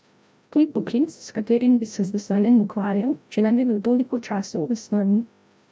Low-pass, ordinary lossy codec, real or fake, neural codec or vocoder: none; none; fake; codec, 16 kHz, 0.5 kbps, FreqCodec, larger model